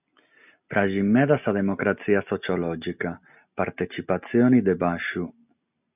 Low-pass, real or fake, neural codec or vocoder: 3.6 kHz; real; none